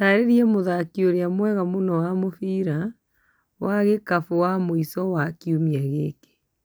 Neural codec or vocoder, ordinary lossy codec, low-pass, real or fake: none; none; none; real